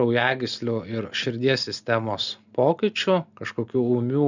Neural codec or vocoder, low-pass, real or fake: none; 7.2 kHz; real